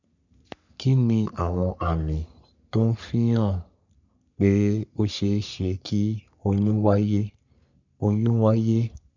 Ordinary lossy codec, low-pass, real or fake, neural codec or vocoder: none; 7.2 kHz; fake; codec, 44.1 kHz, 3.4 kbps, Pupu-Codec